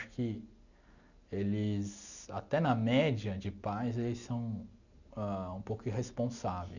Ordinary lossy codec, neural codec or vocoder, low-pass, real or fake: none; none; 7.2 kHz; real